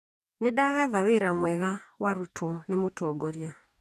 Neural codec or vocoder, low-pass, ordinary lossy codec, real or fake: codec, 44.1 kHz, 2.6 kbps, DAC; 14.4 kHz; none; fake